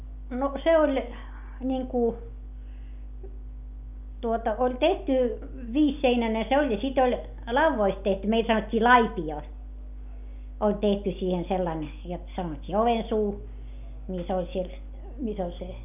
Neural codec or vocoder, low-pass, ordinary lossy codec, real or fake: none; 3.6 kHz; none; real